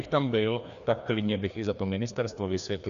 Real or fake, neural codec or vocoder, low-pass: fake; codec, 16 kHz, 2 kbps, FreqCodec, larger model; 7.2 kHz